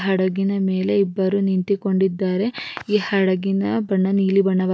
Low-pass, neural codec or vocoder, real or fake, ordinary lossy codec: none; none; real; none